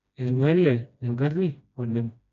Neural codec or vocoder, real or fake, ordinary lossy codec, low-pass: codec, 16 kHz, 1 kbps, FreqCodec, smaller model; fake; none; 7.2 kHz